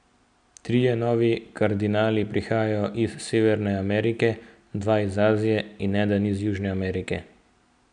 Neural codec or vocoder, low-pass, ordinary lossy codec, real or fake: none; 9.9 kHz; none; real